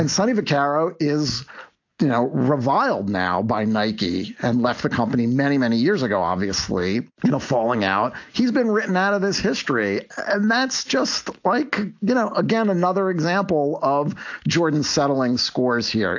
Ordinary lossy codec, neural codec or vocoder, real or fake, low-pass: AAC, 48 kbps; none; real; 7.2 kHz